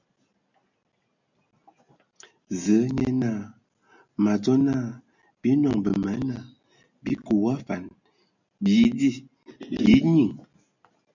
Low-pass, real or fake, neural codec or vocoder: 7.2 kHz; real; none